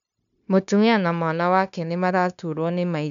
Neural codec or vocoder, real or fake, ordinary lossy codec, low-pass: codec, 16 kHz, 0.9 kbps, LongCat-Audio-Codec; fake; none; 7.2 kHz